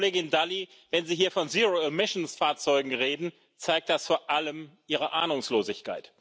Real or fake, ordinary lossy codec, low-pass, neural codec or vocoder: real; none; none; none